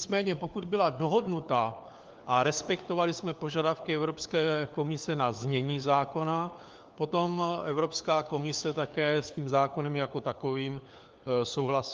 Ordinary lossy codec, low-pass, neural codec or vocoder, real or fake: Opus, 32 kbps; 7.2 kHz; codec, 16 kHz, 4 kbps, FunCodec, trained on LibriTTS, 50 frames a second; fake